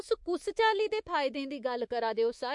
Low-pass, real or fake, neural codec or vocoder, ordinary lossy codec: 10.8 kHz; fake; codec, 24 kHz, 3.1 kbps, DualCodec; MP3, 48 kbps